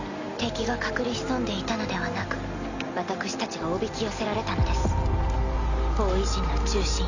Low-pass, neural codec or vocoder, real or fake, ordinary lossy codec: 7.2 kHz; none; real; none